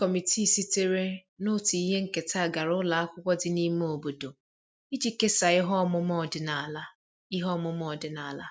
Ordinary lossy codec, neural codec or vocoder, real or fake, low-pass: none; none; real; none